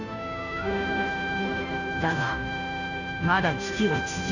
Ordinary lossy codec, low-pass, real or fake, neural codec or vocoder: none; 7.2 kHz; fake; codec, 16 kHz, 0.5 kbps, FunCodec, trained on Chinese and English, 25 frames a second